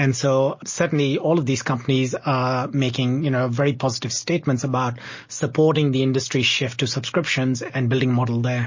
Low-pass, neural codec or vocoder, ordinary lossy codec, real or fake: 7.2 kHz; none; MP3, 32 kbps; real